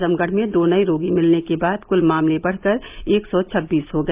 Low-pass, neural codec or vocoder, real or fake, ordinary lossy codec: 3.6 kHz; none; real; Opus, 24 kbps